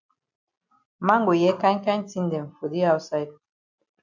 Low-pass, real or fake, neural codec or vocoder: 7.2 kHz; real; none